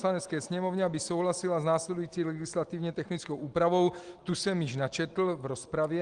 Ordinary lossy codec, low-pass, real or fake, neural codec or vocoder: Opus, 24 kbps; 9.9 kHz; real; none